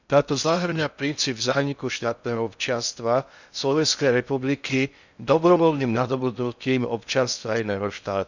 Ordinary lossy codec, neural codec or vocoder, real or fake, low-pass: none; codec, 16 kHz in and 24 kHz out, 0.6 kbps, FocalCodec, streaming, 2048 codes; fake; 7.2 kHz